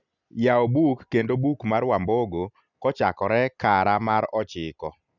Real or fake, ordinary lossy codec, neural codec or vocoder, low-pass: real; none; none; 7.2 kHz